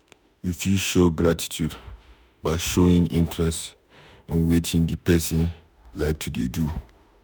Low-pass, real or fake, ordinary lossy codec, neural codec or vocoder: none; fake; none; autoencoder, 48 kHz, 32 numbers a frame, DAC-VAE, trained on Japanese speech